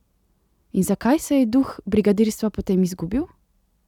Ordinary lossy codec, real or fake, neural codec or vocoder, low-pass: none; real; none; 19.8 kHz